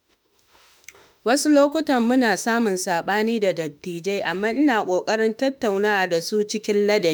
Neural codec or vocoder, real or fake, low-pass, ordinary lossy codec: autoencoder, 48 kHz, 32 numbers a frame, DAC-VAE, trained on Japanese speech; fake; none; none